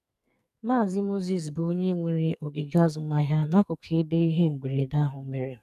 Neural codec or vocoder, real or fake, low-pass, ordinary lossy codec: codec, 44.1 kHz, 2.6 kbps, SNAC; fake; 14.4 kHz; none